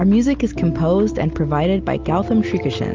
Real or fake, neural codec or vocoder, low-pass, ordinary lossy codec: real; none; 7.2 kHz; Opus, 32 kbps